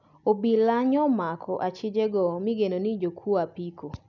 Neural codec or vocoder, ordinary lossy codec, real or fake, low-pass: none; none; real; 7.2 kHz